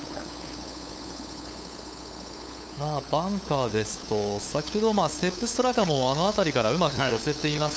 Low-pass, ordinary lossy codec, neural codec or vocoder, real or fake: none; none; codec, 16 kHz, 8 kbps, FunCodec, trained on LibriTTS, 25 frames a second; fake